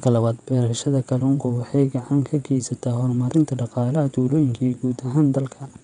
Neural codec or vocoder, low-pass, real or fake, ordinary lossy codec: vocoder, 22.05 kHz, 80 mel bands, WaveNeXt; 9.9 kHz; fake; none